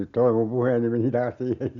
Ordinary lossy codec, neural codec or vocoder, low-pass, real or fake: none; none; 7.2 kHz; real